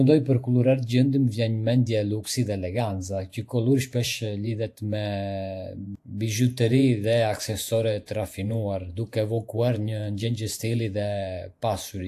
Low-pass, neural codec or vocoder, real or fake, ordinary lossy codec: 14.4 kHz; vocoder, 48 kHz, 128 mel bands, Vocos; fake; AAC, 64 kbps